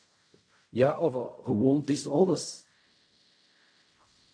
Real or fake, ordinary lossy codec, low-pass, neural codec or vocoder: fake; MP3, 64 kbps; 9.9 kHz; codec, 16 kHz in and 24 kHz out, 0.4 kbps, LongCat-Audio-Codec, fine tuned four codebook decoder